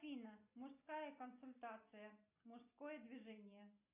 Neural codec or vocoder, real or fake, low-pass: none; real; 3.6 kHz